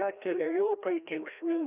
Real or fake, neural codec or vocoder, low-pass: fake; codec, 16 kHz, 1 kbps, FreqCodec, larger model; 3.6 kHz